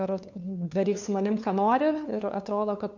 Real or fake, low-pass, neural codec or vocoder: fake; 7.2 kHz; codec, 16 kHz, 2 kbps, FunCodec, trained on LibriTTS, 25 frames a second